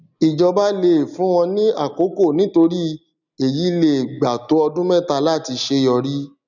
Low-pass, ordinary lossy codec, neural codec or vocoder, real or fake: 7.2 kHz; none; none; real